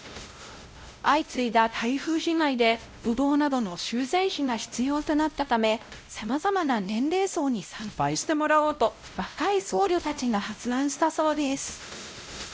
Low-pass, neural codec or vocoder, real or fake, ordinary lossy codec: none; codec, 16 kHz, 0.5 kbps, X-Codec, WavLM features, trained on Multilingual LibriSpeech; fake; none